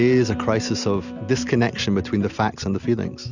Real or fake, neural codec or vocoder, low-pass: real; none; 7.2 kHz